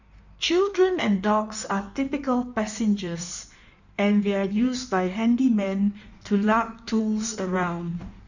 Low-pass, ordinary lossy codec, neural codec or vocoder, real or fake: 7.2 kHz; none; codec, 16 kHz in and 24 kHz out, 1.1 kbps, FireRedTTS-2 codec; fake